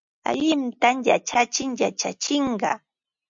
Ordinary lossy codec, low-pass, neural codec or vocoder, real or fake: MP3, 48 kbps; 7.2 kHz; none; real